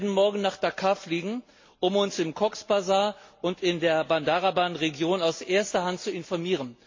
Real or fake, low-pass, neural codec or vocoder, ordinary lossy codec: real; 7.2 kHz; none; MP3, 32 kbps